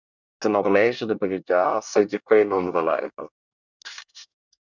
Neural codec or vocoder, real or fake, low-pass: codec, 24 kHz, 1 kbps, SNAC; fake; 7.2 kHz